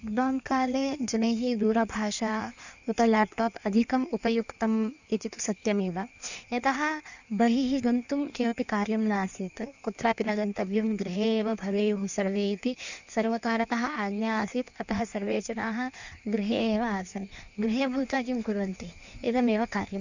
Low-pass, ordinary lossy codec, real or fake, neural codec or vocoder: 7.2 kHz; none; fake; codec, 16 kHz in and 24 kHz out, 1.1 kbps, FireRedTTS-2 codec